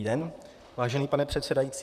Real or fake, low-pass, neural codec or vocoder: fake; 14.4 kHz; vocoder, 44.1 kHz, 128 mel bands, Pupu-Vocoder